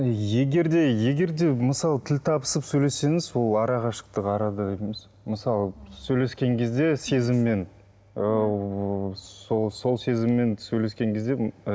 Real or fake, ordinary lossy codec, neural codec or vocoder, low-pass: real; none; none; none